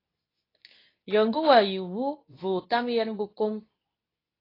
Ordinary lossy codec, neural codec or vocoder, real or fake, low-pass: AAC, 24 kbps; codec, 24 kHz, 0.9 kbps, WavTokenizer, medium speech release version 2; fake; 5.4 kHz